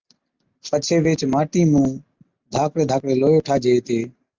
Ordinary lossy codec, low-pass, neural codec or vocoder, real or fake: Opus, 24 kbps; 7.2 kHz; none; real